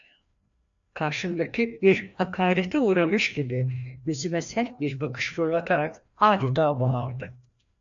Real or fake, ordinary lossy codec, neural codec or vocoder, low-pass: fake; AAC, 64 kbps; codec, 16 kHz, 1 kbps, FreqCodec, larger model; 7.2 kHz